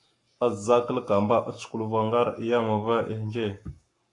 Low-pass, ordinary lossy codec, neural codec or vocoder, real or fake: 10.8 kHz; AAC, 48 kbps; codec, 44.1 kHz, 7.8 kbps, Pupu-Codec; fake